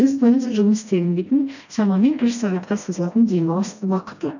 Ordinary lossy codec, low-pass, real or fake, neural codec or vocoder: none; 7.2 kHz; fake; codec, 16 kHz, 1 kbps, FreqCodec, smaller model